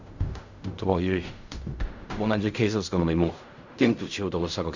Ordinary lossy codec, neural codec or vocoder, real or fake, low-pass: Opus, 64 kbps; codec, 16 kHz in and 24 kHz out, 0.4 kbps, LongCat-Audio-Codec, fine tuned four codebook decoder; fake; 7.2 kHz